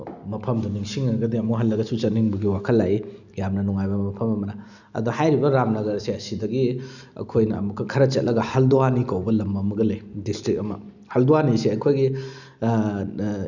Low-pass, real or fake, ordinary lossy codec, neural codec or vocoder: 7.2 kHz; real; none; none